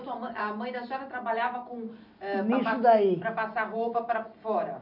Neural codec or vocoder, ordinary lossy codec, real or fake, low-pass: none; none; real; 5.4 kHz